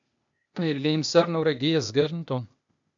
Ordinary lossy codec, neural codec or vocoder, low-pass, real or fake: MP3, 64 kbps; codec, 16 kHz, 0.8 kbps, ZipCodec; 7.2 kHz; fake